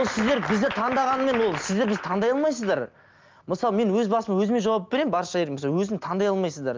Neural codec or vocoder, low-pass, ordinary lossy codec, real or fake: none; 7.2 kHz; Opus, 24 kbps; real